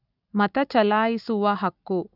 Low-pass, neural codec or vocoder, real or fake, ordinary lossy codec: 5.4 kHz; none; real; none